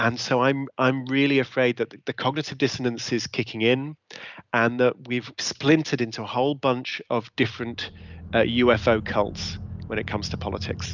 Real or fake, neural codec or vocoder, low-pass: real; none; 7.2 kHz